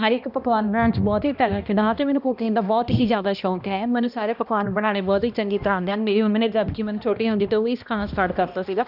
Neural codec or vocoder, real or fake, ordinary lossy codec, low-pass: codec, 16 kHz, 1 kbps, X-Codec, HuBERT features, trained on balanced general audio; fake; none; 5.4 kHz